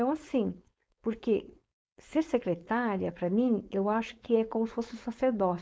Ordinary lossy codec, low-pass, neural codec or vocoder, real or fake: none; none; codec, 16 kHz, 4.8 kbps, FACodec; fake